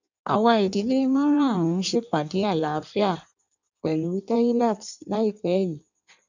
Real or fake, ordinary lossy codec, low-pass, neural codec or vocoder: fake; none; 7.2 kHz; codec, 16 kHz in and 24 kHz out, 1.1 kbps, FireRedTTS-2 codec